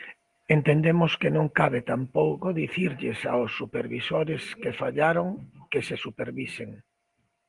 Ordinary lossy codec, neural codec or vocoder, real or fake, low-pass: Opus, 24 kbps; none; real; 10.8 kHz